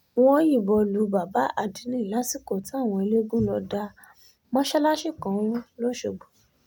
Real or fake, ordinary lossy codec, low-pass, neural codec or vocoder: real; none; none; none